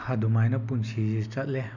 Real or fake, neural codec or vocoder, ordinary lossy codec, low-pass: real; none; none; 7.2 kHz